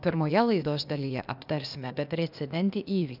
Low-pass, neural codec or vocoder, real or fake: 5.4 kHz; codec, 16 kHz, 0.8 kbps, ZipCodec; fake